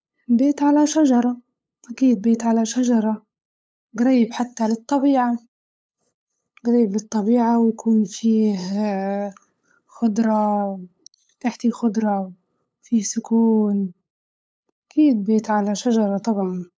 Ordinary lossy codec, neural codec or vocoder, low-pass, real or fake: none; codec, 16 kHz, 8 kbps, FunCodec, trained on LibriTTS, 25 frames a second; none; fake